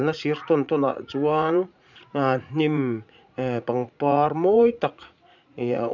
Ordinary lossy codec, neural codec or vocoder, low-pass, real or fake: none; vocoder, 44.1 kHz, 80 mel bands, Vocos; 7.2 kHz; fake